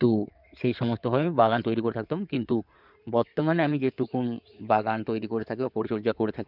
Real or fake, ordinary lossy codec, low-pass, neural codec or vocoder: fake; none; 5.4 kHz; codec, 16 kHz in and 24 kHz out, 2.2 kbps, FireRedTTS-2 codec